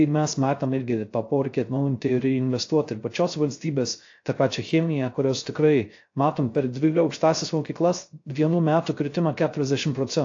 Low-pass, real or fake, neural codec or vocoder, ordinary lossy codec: 7.2 kHz; fake; codec, 16 kHz, 0.3 kbps, FocalCodec; AAC, 48 kbps